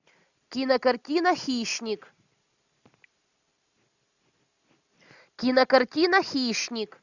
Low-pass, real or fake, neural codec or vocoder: 7.2 kHz; real; none